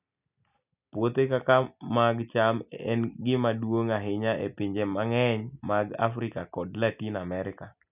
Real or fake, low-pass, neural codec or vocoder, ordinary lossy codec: real; 3.6 kHz; none; none